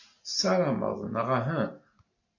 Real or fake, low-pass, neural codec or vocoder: real; 7.2 kHz; none